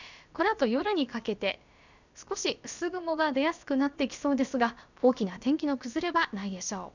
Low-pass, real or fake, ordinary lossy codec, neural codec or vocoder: 7.2 kHz; fake; none; codec, 16 kHz, about 1 kbps, DyCAST, with the encoder's durations